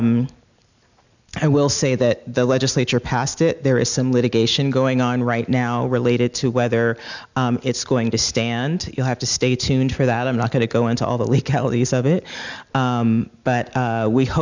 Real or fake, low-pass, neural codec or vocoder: real; 7.2 kHz; none